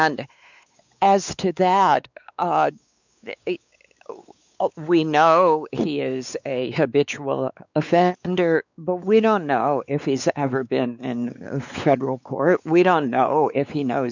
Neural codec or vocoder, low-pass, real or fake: codec, 16 kHz, 4 kbps, X-Codec, WavLM features, trained on Multilingual LibriSpeech; 7.2 kHz; fake